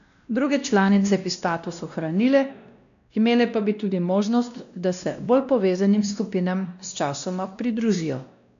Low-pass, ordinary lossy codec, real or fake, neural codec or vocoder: 7.2 kHz; none; fake; codec, 16 kHz, 1 kbps, X-Codec, WavLM features, trained on Multilingual LibriSpeech